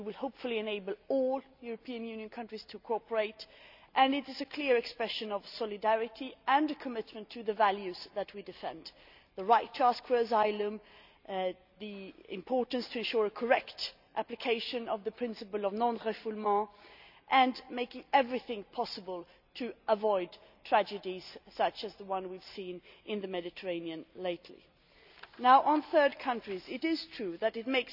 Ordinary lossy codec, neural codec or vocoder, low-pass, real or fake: none; none; 5.4 kHz; real